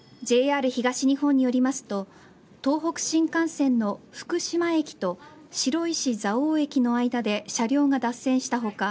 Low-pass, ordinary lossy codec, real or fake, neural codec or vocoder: none; none; real; none